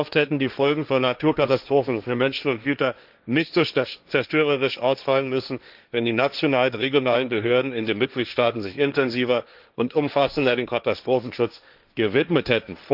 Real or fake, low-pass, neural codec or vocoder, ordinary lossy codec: fake; 5.4 kHz; codec, 16 kHz, 1.1 kbps, Voila-Tokenizer; none